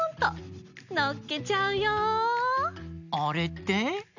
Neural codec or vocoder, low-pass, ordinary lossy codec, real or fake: none; 7.2 kHz; none; real